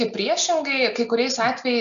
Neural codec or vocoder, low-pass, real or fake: none; 7.2 kHz; real